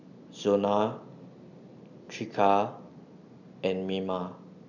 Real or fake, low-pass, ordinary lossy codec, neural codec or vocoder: real; 7.2 kHz; none; none